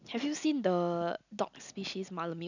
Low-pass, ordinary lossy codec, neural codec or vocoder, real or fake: 7.2 kHz; none; none; real